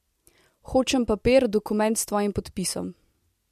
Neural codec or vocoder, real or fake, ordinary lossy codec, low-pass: none; real; MP3, 64 kbps; 14.4 kHz